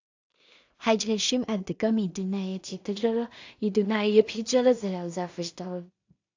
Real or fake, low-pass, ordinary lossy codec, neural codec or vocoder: fake; 7.2 kHz; AAC, 48 kbps; codec, 16 kHz in and 24 kHz out, 0.4 kbps, LongCat-Audio-Codec, two codebook decoder